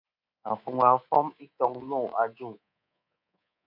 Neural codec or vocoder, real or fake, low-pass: codec, 24 kHz, 3.1 kbps, DualCodec; fake; 5.4 kHz